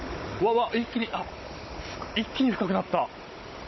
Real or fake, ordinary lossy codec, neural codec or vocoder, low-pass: fake; MP3, 24 kbps; codec, 16 kHz, 16 kbps, FunCodec, trained on Chinese and English, 50 frames a second; 7.2 kHz